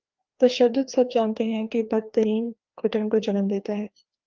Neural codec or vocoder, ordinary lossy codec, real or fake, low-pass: codec, 16 kHz, 2 kbps, FreqCodec, larger model; Opus, 32 kbps; fake; 7.2 kHz